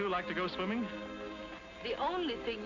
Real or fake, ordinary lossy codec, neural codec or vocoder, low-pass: real; AAC, 48 kbps; none; 7.2 kHz